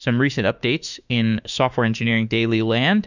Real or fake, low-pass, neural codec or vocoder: fake; 7.2 kHz; autoencoder, 48 kHz, 32 numbers a frame, DAC-VAE, trained on Japanese speech